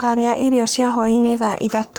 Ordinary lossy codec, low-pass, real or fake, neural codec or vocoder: none; none; fake; codec, 44.1 kHz, 3.4 kbps, Pupu-Codec